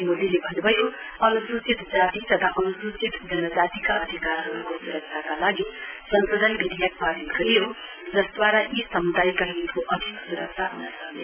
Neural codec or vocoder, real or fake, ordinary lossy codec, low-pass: none; real; none; 3.6 kHz